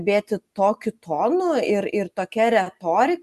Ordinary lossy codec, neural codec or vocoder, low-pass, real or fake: Opus, 64 kbps; none; 14.4 kHz; real